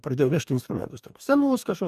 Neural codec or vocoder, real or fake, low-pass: codec, 44.1 kHz, 2.6 kbps, DAC; fake; 14.4 kHz